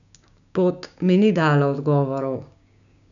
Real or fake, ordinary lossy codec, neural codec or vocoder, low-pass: fake; none; codec, 16 kHz, 6 kbps, DAC; 7.2 kHz